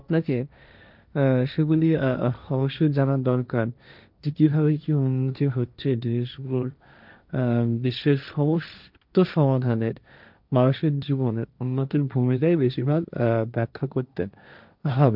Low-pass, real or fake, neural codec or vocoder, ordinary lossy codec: 5.4 kHz; fake; codec, 16 kHz, 1.1 kbps, Voila-Tokenizer; none